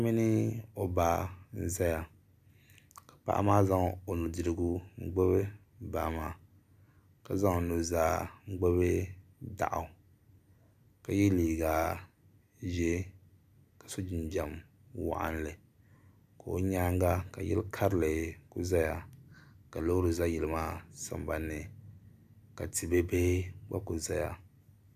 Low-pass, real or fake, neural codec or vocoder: 14.4 kHz; real; none